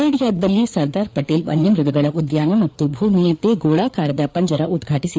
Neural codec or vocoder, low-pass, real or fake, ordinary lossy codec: codec, 16 kHz, 4 kbps, FreqCodec, larger model; none; fake; none